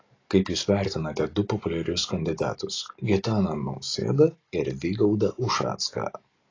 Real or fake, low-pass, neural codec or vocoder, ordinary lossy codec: real; 7.2 kHz; none; AAC, 32 kbps